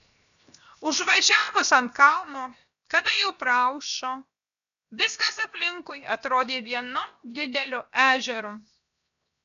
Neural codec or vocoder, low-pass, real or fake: codec, 16 kHz, 0.7 kbps, FocalCodec; 7.2 kHz; fake